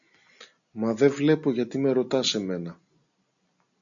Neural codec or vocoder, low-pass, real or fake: none; 7.2 kHz; real